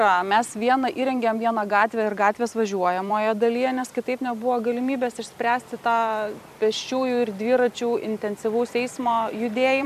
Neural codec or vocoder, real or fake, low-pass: vocoder, 44.1 kHz, 128 mel bands every 256 samples, BigVGAN v2; fake; 14.4 kHz